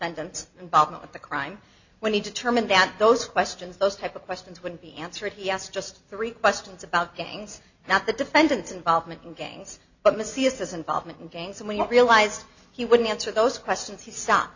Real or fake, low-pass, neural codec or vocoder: real; 7.2 kHz; none